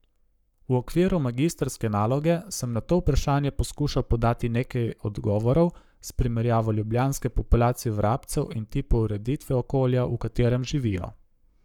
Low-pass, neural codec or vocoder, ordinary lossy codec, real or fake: 19.8 kHz; codec, 44.1 kHz, 7.8 kbps, Pupu-Codec; none; fake